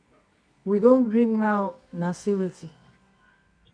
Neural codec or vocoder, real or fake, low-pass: codec, 24 kHz, 0.9 kbps, WavTokenizer, medium music audio release; fake; 9.9 kHz